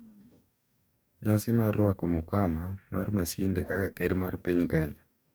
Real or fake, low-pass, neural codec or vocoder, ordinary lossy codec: fake; none; codec, 44.1 kHz, 2.6 kbps, DAC; none